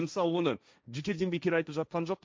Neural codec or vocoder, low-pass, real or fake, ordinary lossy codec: codec, 16 kHz, 1.1 kbps, Voila-Tokenizer; none; fake; none